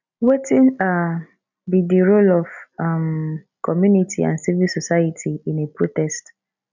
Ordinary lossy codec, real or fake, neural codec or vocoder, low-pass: none; real; none; 7.2 kHz